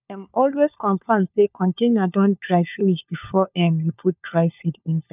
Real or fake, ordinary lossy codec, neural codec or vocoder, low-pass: fake; none; codec, 16 kHz, 4 kbps, FunCodec, trained on LibriTTS, 50 frames a second; 3.6 kHz